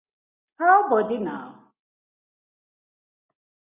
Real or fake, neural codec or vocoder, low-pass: real; none; 3.6 kHz